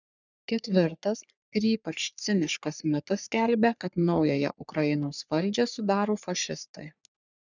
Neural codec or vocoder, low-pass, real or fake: codec, 44.1 kHz, 3.4 kbps, Pupu-Codec; 7.2 kHz; fake